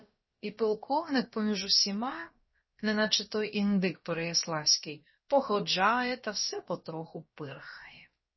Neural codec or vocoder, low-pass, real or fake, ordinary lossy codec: codec, 16 kHz, about 1 kbps, DyCAST, with the encoder's durations; 7.2 kHz; fake; MP3, 24 kbps